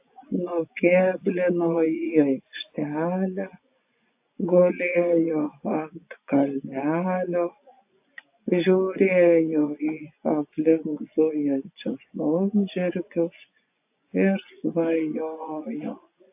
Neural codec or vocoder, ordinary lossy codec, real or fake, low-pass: vocoder, 24 kHz, 100 mel bands, Vocos; AAC, 32 kbps; fake; 3.6 kHz